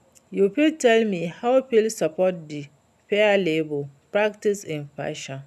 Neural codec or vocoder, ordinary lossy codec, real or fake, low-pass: none; none; real; 14.4 kHz